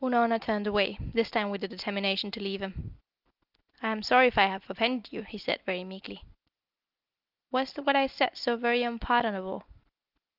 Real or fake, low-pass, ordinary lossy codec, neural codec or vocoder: real; 5.4 kHz; Opus, 32 kbps; none